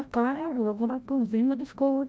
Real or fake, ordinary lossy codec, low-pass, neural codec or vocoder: fake; none; none; codec, 16 kHz, 0.5 kbps, FreqCodec, larger model